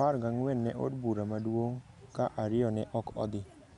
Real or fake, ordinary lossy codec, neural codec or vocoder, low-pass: real; none; none; 10.8 kHz